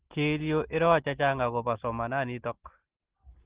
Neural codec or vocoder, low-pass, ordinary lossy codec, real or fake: none; 3.6 kHz; Opus, 16 kbps; real